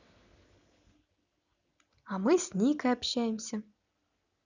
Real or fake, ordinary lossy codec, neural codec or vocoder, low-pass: real; none; none; 7.2 kHz